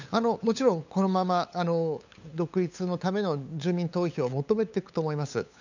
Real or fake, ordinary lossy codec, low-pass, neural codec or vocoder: fake; none; 7.2 kHz; codec, 16 kHz, 8 kbps, FunCodec, trained on LibriTTS, 25 frames a second